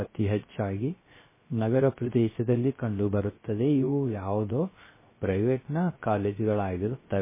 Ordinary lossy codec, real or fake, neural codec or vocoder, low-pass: MP3, 16 kbps; fake; codec, 16 kHz, 0.3 kbps, FocalCodec; 3.6 kHz